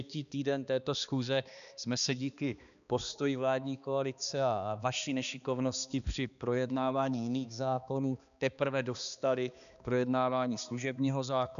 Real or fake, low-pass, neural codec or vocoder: fake; 7.2 kHz; codec, 16 kHz, 2 kbps, X-Codec, HuBERT features, trained on balanced general audio